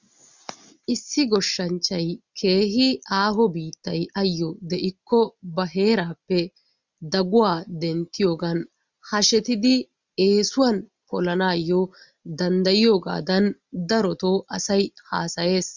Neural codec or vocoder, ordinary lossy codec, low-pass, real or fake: none; Opus, 64 kbps; 7.2 kHz; real